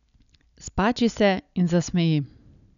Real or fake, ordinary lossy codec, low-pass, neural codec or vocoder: real; none; 7.2 kHz; none